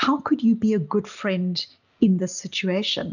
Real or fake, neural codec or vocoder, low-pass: real; none; 7.2 kHz